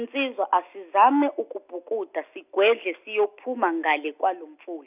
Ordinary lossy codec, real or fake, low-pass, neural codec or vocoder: none; real; 3.6 kHz; none